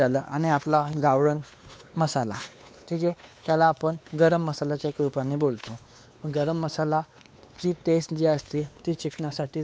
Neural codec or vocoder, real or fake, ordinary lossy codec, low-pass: codec, 16 kHz, 2 kbps, X-Codec, WavLM features, trained on Multilingual LibriSpeech; fake; none; none